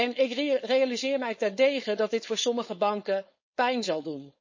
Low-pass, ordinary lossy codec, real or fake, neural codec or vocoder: 7.2 kHz; MP3, 32 kbps; fake; codec, 16 kHz, 4.8 kbps, FACodec